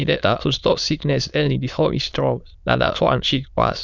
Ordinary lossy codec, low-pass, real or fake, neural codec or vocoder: none; 7.2 kHz; fake; autoencoder, 22.05 kHz, a latent of 192 numbers a frame, VITS, trained on many speakers